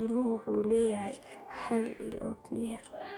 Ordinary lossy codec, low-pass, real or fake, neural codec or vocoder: none; 19.8 kHz; fake; codec, 44.1 kHz, 2.6 kbps, DAC